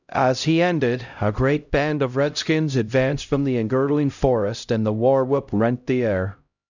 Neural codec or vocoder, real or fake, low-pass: codec, 16 kHz, 0.5 kbps, X-Codec, HuBERT features, trained on LibriSpeech; fake; 7.2 kHz